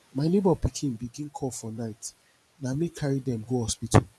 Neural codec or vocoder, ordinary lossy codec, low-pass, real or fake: vocoder, 24 kHz, 100 mel bands, Vocos; none; none; fake